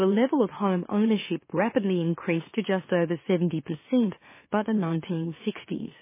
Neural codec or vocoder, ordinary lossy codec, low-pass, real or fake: autoencoder, 44.1 kHz, a latent of 192 numbers a frame, MeloTTS; MP3, 16 kbps; 3.6 kHz; fake